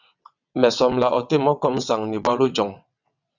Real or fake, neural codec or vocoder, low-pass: fake; vocoder, 22.05 kHz, 80 mel bands, WaveNeXt; 7.2 kHz